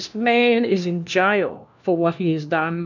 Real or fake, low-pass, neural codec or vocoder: fake; 7.2 kHz; codec, 16 kHz, 1 kbps, FunCodec, trained on LibriTTS, 50 frames a second